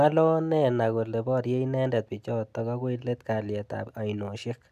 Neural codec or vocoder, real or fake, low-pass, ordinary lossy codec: none; real; 14.4 kHz; none